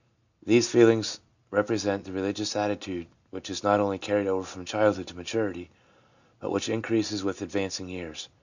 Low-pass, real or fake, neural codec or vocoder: 7.2 kHz; real; none